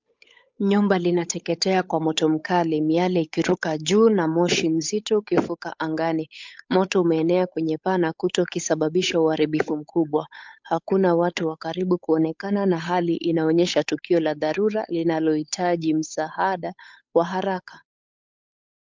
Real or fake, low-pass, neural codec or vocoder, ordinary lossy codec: fake; 7.2 kHz; codec, 16 kHz, 8 kbps, FunCodec, trained on Chinese and English, 25 frames a second; MP3, 64 kbps